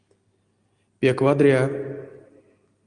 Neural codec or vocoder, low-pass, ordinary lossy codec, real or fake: none; 9.9 kHz; Opus, 32 kbps; real